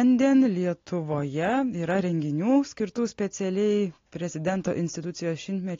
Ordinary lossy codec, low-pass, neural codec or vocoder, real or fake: AAC, 32 kbps; 7.2 kHz; none; real